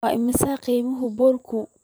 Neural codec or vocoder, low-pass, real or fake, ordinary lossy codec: vocoder, 44.1 kHz, 128 mel bands every 512 samples, BigVGAN v2; none; fake; none